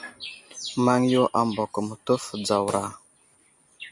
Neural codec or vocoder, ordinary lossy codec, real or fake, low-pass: none; MP3, 64 kbps; real; 10.8 kHz